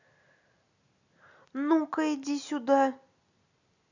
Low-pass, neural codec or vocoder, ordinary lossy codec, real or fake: 7.2 kHz; none; none; real